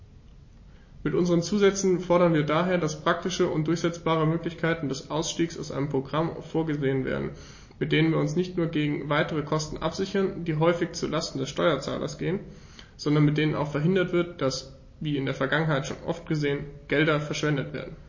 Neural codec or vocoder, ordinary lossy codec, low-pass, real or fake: none; MP3, 32 kbps; 7.2 kHz; real